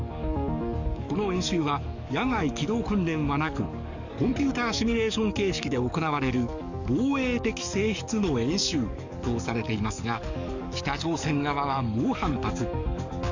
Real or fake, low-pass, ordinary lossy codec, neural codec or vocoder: fake; 7.2 kHz; none; codec, 44.1 kHz, 7.8 kbps, DAC